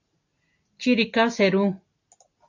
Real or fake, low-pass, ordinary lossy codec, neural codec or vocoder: real; 7.2 kHz; AAC, 48 kbps; none